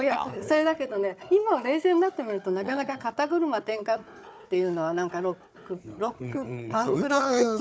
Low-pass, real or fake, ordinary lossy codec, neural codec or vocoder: none; fake; none; codec, 16 kHz, 4 kbps, FunCodec, trained on Chinese and English, 50 frames a second